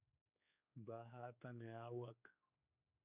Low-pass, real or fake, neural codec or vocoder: 3.6 kHz; fake; codec, 16 kHz, 4 kbps, X-Codec, HuBERT features, trained on general audio